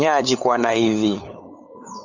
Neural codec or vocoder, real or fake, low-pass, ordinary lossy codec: codec, 16 kHz, 8 kbps, FunCodec, trained on LibriTTS, 25 frames a second; fake; 7.2 kHz; AAC, 48 kbps